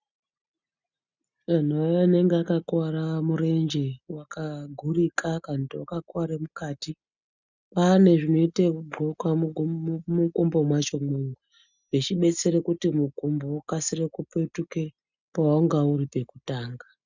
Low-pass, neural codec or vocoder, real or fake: 7.2 kHz; none; real